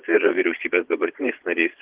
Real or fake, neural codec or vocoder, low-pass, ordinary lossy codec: fake; vocoder, 22.05 kHz, 80 mel bands, Vocos; 3.6 kHz; Opus, 16 kbps